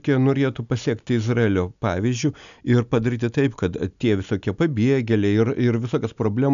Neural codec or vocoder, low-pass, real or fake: none; 7.2 kHz; real